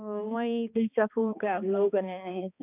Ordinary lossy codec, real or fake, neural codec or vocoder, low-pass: none; fake; codec, 16 kHz, 2 kbps, X-Codec, HuBERT features, trained on balanced general audio; 3.6 kHz